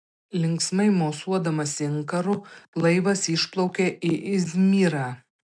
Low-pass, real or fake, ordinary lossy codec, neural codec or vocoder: 9.9 kHz; real; MP3, 64 kbps; none